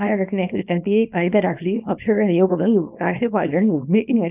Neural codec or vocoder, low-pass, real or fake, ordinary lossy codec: codec, 24 kHz, 0.9 kbps, WavTokenizer, small release; 3.6 kHz; fake; none